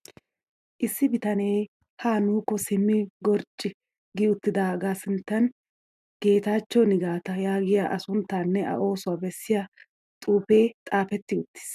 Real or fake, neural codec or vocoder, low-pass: real; none; 14.4 kHz